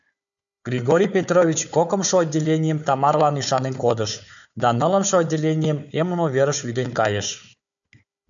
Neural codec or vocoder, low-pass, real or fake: codec, 16 kHz, 4 kbps, FunCodec, trained on Chinese and English, 50 frames a second; 7.2 kHz; fake